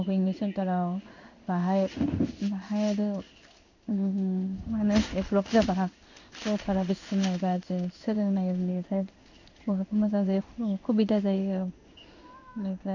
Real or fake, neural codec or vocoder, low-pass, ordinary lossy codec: fake; codec, 16 kHz in and 24 kHz out, 1 kbps, XY-Tokenizer; 7.2 kHz; none